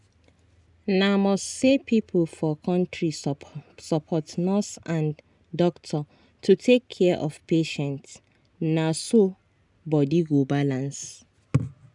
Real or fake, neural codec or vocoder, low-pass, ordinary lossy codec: real; none; 10.8 kHz; none